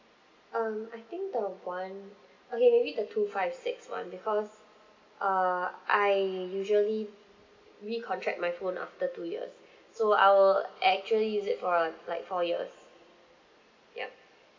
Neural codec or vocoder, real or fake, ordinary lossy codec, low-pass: none; real; MP3, 48 kbps; 7.2 kHz